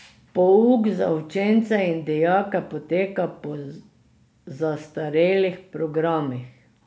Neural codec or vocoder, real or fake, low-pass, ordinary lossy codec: none; real; none; none